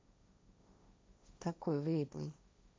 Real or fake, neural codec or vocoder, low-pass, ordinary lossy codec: fake; codec, 16 kHz, 1.1 kbps, Voila-Tokenizer; none; none